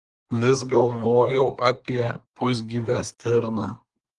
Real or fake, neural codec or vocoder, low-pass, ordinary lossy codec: fake; codec, 24 kHz, 1 kbps, SNAC; 10.8 kHz; Opus, 24 kbps